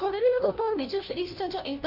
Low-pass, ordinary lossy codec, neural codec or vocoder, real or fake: 5.4 kHz; none; codec, 16 kHz, 1 kbps, FunCodec, trained on LibriTTS, 50 frames a second; fake